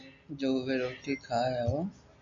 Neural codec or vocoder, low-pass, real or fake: none; 7.2 kHz; real